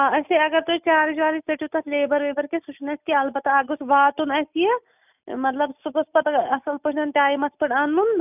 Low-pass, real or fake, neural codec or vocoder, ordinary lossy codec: 3.6 kHz; real; none; none